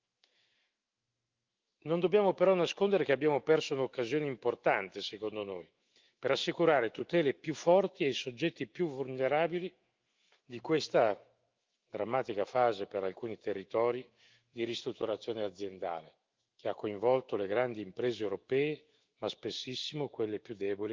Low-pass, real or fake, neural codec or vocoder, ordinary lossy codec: 7.2 kHz; fake; autoencoder, 48 kHz, 128 numbers a frame, DAC-VAE, trained on Japanese speech; Opus, 24 kbps